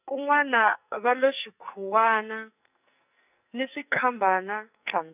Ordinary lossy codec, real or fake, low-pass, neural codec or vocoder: none; fake; 3.6 kHz; codec, 44.1 kHz, 2.6 kbps, SNAC